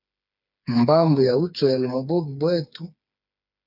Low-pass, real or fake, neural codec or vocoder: 5.4 kHz; fake; codec, 16 kHz, 4 kbps, FreqCodec, smaller model